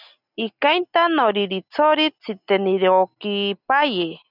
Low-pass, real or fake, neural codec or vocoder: 5.4 kHz; real; none